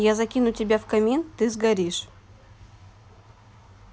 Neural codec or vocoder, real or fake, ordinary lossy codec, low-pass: none; real; none; none